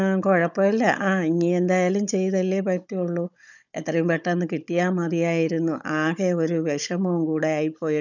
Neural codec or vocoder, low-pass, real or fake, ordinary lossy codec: codec, 16 kHz, 16 kbps, FunCodec, trained on Chinese and English, 50 frames a second; 7.2 kHz; fake; none